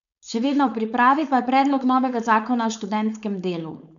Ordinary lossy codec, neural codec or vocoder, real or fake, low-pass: none; codec, 16 kHz, 4.8 kbps, FACodec; fake; 7.2 kHz